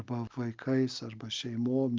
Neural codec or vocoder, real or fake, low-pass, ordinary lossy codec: none; real; 7.2 kHz; Opus, 32 kbps